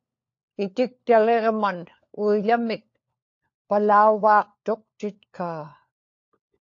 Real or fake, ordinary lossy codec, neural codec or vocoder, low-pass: fake; AAC, 48 kbps; codec, 16 kHz, 4 kbps, FunCodec, trained on LibriTTS, 50 frames a second; 7.2 kHz